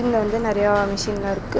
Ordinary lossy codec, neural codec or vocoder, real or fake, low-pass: none; none; real; none